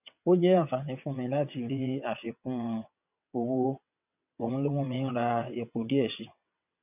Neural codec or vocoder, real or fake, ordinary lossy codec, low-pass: vocoder, 22.05 kHz, 80 mel bands, WaveNeXt; fake; none; 3.6 kHz